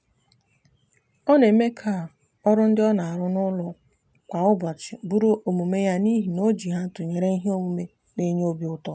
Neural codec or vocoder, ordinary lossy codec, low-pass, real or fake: none; none; none; real